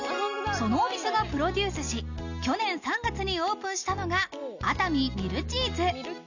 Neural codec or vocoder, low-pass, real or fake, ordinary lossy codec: none; 7.2 kHz; real; none